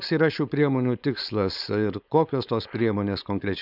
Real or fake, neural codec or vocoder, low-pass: fake; codec, 16 kHz, 4.8 kbps, FACodec; 5.4 kHz